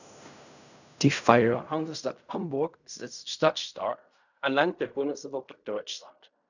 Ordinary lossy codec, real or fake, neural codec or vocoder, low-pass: none; fake; codec, 16 kHz in and 24 kHz out, 0.4 kbps, LongCat-Audio-Codec, fine tuned four codebook decoder; 7.2 kHz